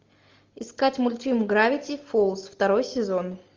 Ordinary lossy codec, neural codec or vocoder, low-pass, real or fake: Opus, 32 kbps; none; 7.2 kHz; real